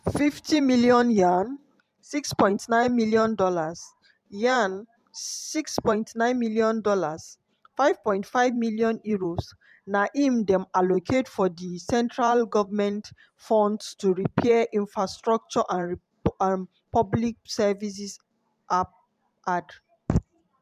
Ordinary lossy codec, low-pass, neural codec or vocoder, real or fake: MP3, 96 kbps; 14.4 kHz; vocoder, 44.1 kHz, 128 mel bands every 512 samples, BigVGAN v2; fake